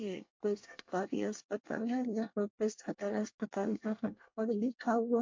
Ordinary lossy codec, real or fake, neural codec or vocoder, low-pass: MP3, 48 kbps; fake; codec, 24 kHz, 1 kbps, SNAC; 7.2 kHz